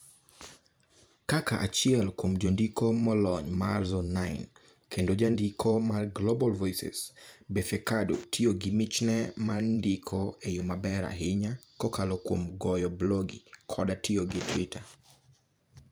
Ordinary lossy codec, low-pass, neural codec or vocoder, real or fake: none; none; vocoder, 44.1 kHz, 128 mel bands every 512 samples, BigVGAN v2; fake